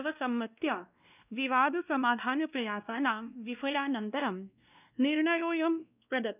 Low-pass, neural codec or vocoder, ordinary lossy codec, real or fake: 3.6 kHz; codec, 16 kHz, 1 kbps, X-Codec, WavLM features, trained on Multilingual LibriSpeech; none; fake